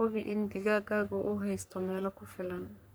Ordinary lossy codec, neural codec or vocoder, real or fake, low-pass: none; codec, 44.1 kHz, 3.4 kbps, Pupu-Codec; fake; none